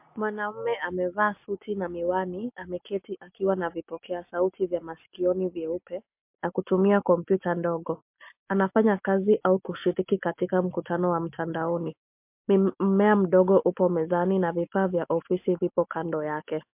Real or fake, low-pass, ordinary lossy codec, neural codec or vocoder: real; 3.6 kHz; MP3, 32 kbps; none